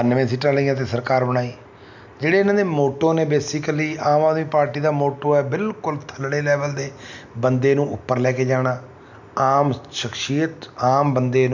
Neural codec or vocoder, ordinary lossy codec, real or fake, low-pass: none; none; real; 7.2 kHz